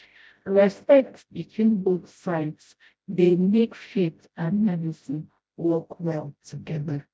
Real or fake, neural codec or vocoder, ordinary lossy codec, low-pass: fake; codec, 16 kHz, 0.5 kbps, FreqCodec, smaller model; none; none